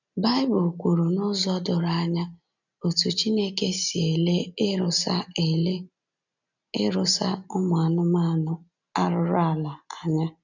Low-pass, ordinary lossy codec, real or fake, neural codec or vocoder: 7.2 kHz; none; real; none